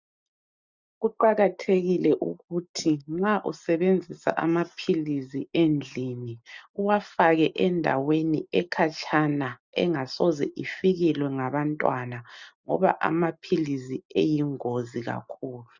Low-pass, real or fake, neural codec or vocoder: 7.2 kHz; real; none